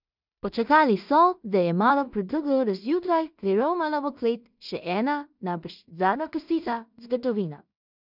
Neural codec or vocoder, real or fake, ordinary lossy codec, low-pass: codec, 16 kHz in and 24 kHz out, 0.4 kbps, LongCat-Audio-Codec, two codebook decoder; fake; none; 5.4 kHz